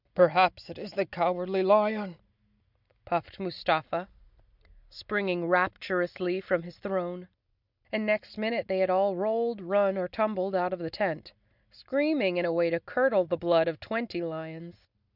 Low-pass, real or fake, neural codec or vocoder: 5.4 kHz; real; none